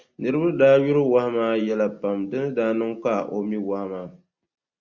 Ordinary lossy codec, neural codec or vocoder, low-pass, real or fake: Opus, 64 kbps; none; 7.2 kHz; real